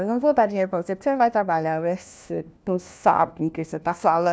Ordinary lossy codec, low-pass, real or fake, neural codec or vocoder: none; none; fake; codec, 16 kHz, 1 kbps, FunCodec, trained on LibriTTS, 50 frames a second